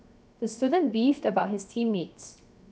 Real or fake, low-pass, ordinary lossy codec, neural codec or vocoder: fake; none; none; codec, 16 kHz, 0.7 kbps, FocalCodec